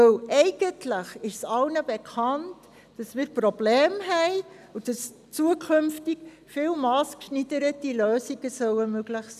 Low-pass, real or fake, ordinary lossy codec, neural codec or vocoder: 14.4 kHz; real; none; none